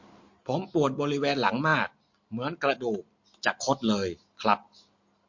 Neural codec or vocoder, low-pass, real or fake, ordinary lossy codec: none; 7.2 kHz; real; MP3, 48 kbps